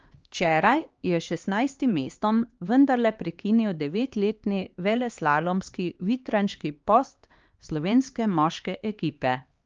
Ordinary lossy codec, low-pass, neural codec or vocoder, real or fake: Opus, 24 kbps; 7.2 kHz; codec, 16 kHz, 2 kbps, X-Codec, HuBERT features, trained on LibriSpeech; fake